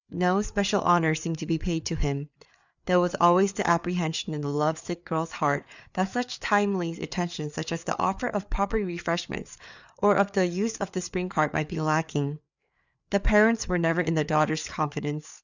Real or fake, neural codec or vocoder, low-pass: fake; codec, 16 kHz, 4 kbps, FreqCodec, larger model; 7.2 kHz